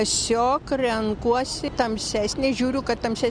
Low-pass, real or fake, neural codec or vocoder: 9.9 kHz; real; none